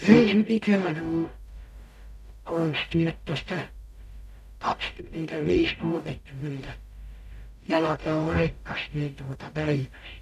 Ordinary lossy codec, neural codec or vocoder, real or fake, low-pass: none; codec, 44.1 kHz, 0.9 kbps, DAC; fake; 14.4 kHz